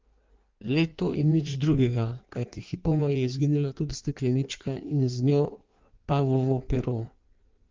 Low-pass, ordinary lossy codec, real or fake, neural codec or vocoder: 7.2 kHz; Opus, 32 kbps; fake; codec, 16 kHz in and 24 kHz out, 1.1 kbps, FireRedTTS-2 codec